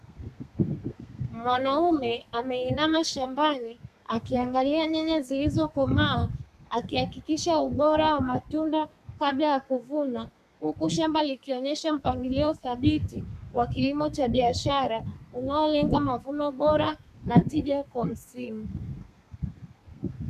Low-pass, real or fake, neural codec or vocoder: 14.4 kHz; fake; codec, 32 kHz, 1.9 kbps, SNAC